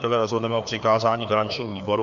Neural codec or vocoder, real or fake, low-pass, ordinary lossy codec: codec, 16 kHz, 2 kbps, FreqCodec, larger model; fake; 7.2 kHz; AAC, 96 kbps